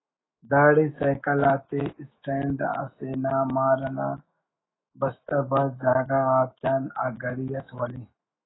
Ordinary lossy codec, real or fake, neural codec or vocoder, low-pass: AAC, 16 kbps; fake; autoencoder, 48 kHz, 128 numbers a frame, DAC-VAE, trained on Japanese speech; 7.2 kHz